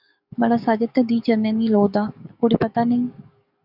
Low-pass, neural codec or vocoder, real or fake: 5.4 kHz; codec, 44.1 kHz, 7.8 kbps, DAC; fake